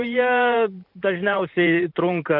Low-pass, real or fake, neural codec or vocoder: 5.4 kHz; fake; vocoder, 44.1 kHz, 128 mel bands every 512 samples, BigVGAN v2